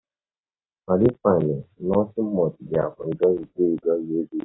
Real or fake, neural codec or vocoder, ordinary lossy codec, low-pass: real; none; AAC, 16 kbps; 7.2 kHz